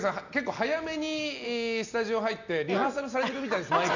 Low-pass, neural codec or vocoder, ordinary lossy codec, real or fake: 7.2 kHz; none; none; real